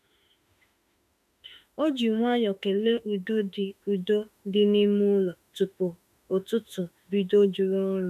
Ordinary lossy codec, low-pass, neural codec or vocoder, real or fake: none; 14.4 kHz; autoencoder, 48 kHz, 32 numbers a frame, DAC-VAE, trained on Japanese speech; fake